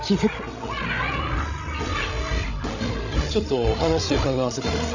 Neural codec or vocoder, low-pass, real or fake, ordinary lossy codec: codec, 16 kHz, 16 kbps, FreqCodec, larger model; 7.2 kHz; fake; none